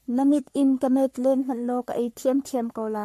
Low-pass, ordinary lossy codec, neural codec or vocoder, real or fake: 14.4 kHz; MP3, 64 kbps; codec, 44.1 kHz, 3.4 kbps, Pupu-Codec; fake